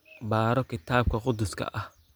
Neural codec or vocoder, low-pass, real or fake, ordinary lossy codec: none; none; real; none